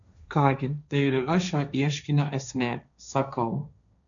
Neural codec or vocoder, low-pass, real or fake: codec, 16 kHz, 1.1 kbps, Voila-Tokenizer; 7.2 kHz; fake